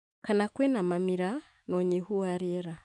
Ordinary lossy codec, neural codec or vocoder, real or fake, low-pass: none; autoencoder, 48 kHz, 128 numbers a frame, DAC-VAE, trained on Japanese speech; fake; 10.8 kHz